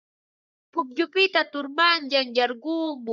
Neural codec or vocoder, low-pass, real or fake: codec, 44.1 kHz, 3.4 kbps, Pupu-Codec; 7.2 kHz; fake